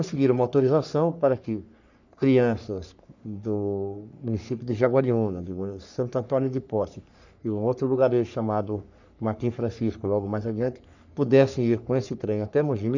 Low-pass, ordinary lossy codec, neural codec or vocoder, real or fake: 7.2 kHz; none; codec, 44.1 kHz, 3.4 kbps, Pupu-Codec; fake